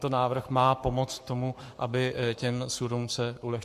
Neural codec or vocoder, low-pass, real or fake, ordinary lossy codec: codec, 44.1 kHz, 7.8 kbps, Pupu-Codec; 14.4 kHz; fake; MP3, 64 kbps